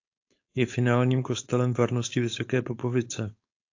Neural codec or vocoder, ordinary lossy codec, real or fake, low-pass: codec, 16 kHz, 4.8 kbps, FACodec; AAC, 48 kbps; fake; 7.2 kHz